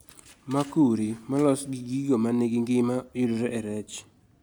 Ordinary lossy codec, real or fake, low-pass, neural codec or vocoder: none; real; none; none